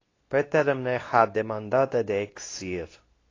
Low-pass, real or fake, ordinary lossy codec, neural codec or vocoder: 7.2 kHz; fake; AAC, 32 kbps; codec, 24 kHz, 0.9 kbps, WavTokenizer, medium speech release version 2